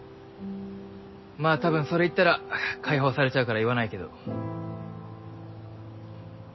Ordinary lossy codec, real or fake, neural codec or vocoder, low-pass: MP3, 24 kbps; real; none; 7.2 kHz